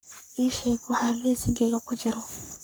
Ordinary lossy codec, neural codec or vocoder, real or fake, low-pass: none; codec, 44.1 kHz, 3.4 kbps, Pupu-Codec; fake; none